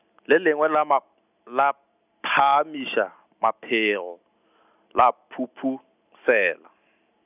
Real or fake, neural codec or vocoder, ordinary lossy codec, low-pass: real; none; none; 3.6 kHz